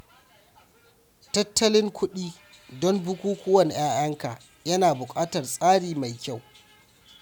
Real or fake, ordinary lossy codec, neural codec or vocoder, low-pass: real; none; none; none